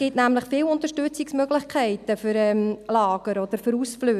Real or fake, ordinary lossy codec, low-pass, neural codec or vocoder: real; none; 14.4 kHz; none